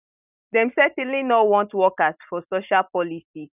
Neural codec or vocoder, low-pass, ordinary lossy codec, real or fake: none; 3.6 kHz; none; real